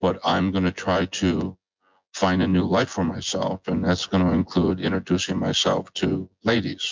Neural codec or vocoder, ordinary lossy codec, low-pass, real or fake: vocoder, 24 kHz, 100 mel bands, Vocos; MP3, 64 kbps; 7.2 kHz; fake